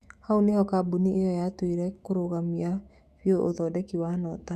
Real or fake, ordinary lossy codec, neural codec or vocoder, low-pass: fake; Opus, 64 kbps; autoencoder, 48 kHz, 128 numbers a frame, DAC-VAE, trained on Japanese speech; 14.4 kHz